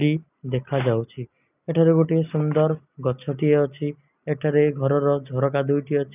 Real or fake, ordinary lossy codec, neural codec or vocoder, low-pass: real; none; none; 3.6 kHz